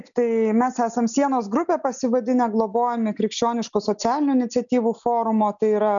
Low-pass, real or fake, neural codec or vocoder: 7.2 kHz; real; none